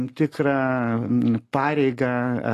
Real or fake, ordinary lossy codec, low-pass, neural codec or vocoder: real; AAC, 48 kbps; 14.4 kHz; none